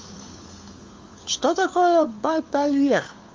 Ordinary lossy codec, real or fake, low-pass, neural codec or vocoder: Opus, 32 kbps; fake; 7.2 kHz; codec, 16 kHz, 4 kbps, FunCodec, trained on LibriTTS, 50 frames a second